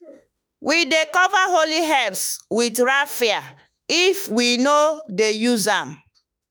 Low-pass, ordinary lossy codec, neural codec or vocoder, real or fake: none; none; autoencoder, 48 kHz, 32 numbers a frame, DAC-VAE, trained on Japanese speech; fake